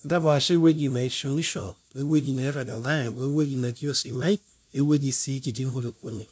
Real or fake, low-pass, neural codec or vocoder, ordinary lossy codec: fake; none; codec, 16 kHz, 0.5 kbps, FunCodec, trained on LibriTTS, 25 frames a second; none